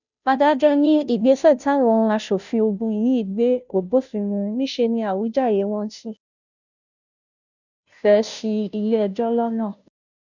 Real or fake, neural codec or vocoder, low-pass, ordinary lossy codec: fake; codec, 16 kHz, 0.5 kbps, FunCodec, trained on Chinese and English, 25 frames a second; 7.2 kHz; none